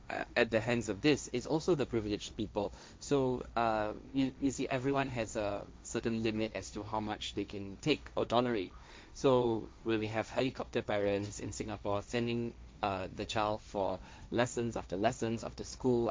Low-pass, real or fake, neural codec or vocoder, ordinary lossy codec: none; fake; codec, 16 kHz, 1.1 kbps, Voila-Tokenizer; none